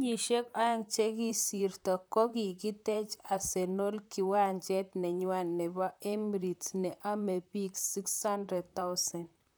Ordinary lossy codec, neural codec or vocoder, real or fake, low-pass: none; vocoder, 44.1 kHz, 128 mel bands, Pupu-Vocoder; fake; none